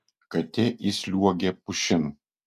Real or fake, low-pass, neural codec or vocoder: real; 14.4 kHz; none